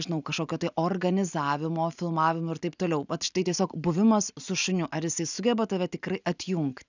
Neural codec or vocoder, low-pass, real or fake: none; 7.2 kHz; real